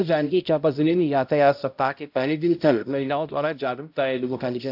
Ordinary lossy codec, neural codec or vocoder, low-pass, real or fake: AAC, 48 kbps; codec, 16 kHz, 0.5 kbps, X-Codec, HuBERT features, trained on balanced general audio; 5.4 kHz; fake